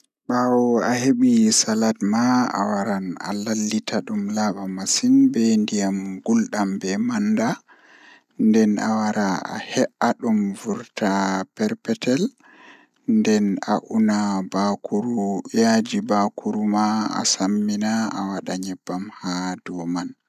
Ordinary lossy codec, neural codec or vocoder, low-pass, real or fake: none; none; 14.4 kHz; real